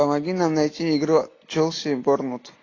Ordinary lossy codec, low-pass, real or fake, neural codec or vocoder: AAC, 32 kbps; 7.2 kHz; real; none